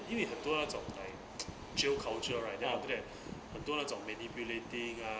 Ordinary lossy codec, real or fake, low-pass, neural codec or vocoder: none; real; none; none